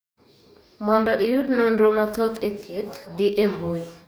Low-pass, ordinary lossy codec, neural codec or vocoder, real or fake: none; none; codec, 44.1 kHz, 2.6 kbps, DAC; fake